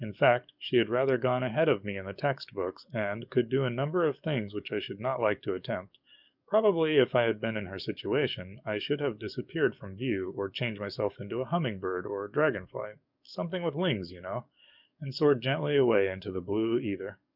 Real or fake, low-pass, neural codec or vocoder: fake; 5.4 kHz; codec, 44.1 kHz, 7.8 kbps, DAC